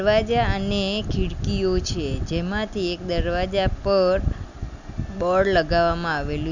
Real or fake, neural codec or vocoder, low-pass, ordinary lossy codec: real; none; 7.2 kHz; none